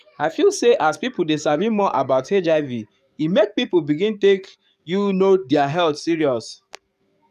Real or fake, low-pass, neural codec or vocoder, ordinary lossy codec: fake; 14.4 kHz; codec, 44.1 kHz, 7.8 kbps, DAC; none